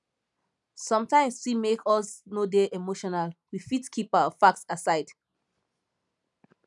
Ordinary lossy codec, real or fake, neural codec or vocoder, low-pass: none; real; none; 10.8 kHz